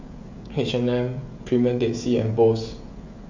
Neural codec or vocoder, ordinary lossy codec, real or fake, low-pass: codec, 16 kHz, 16 kbps, FreqCodec, smaller model; MP3, 48 kbps; fake; 7.2 kHz